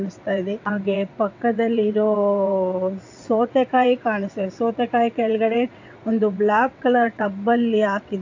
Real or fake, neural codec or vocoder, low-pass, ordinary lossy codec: fake; vocoder, 22.05 kHz, 80 mel bands, WaveNeXt; 7.2 kHz; AAC, 48 kbps